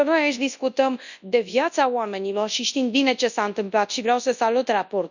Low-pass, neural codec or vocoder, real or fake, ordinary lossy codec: 7.2 kHz; codec, 24 kHz, 0.9 kbps, WavTokenizer, large speech release; fake; none